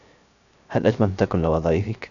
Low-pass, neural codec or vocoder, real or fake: 7.2 kHz; codec, 16 kHz, 0.3 kbps, FocalCodec; fake